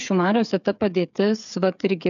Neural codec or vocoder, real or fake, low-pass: codec, 16 kHz, 8 kbps, FreqCodec, smaller model; fake; 7.2 kHz